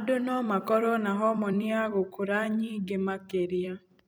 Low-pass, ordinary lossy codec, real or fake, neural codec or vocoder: none; none; fake; vocoder, 44.1 kHz, 128 mel bands every 256 samples, BigVGAN v2